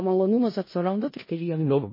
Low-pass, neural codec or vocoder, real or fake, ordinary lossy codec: 5.4 kHz; codec, 16 kHz in and 24 kHz out, 0.4 kbps, LongCat-Audio-Codec, four codebook decoder; fake; MP3, 24 kbps